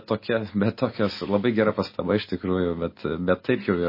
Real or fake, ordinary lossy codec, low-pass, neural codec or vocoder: real; MP3, 24 kbps; 5.4 kHz; none